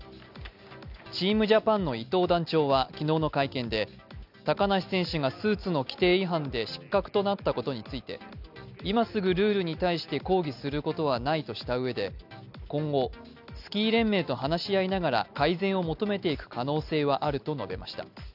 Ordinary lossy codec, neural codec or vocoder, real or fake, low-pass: none; none; real; 5.4 kHz